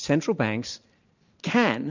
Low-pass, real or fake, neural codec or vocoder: 7.2 kHz; real; none